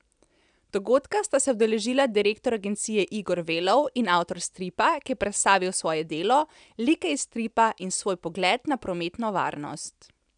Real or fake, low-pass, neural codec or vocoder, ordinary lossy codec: real; 9.9 kHz; none; none